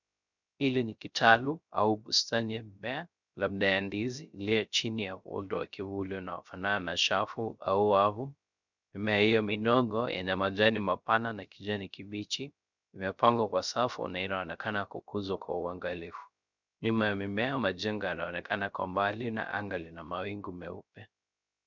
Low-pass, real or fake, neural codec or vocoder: 7.2 kHz; fake; codec, 16 kHz, 0.3 kbps, FocalCodec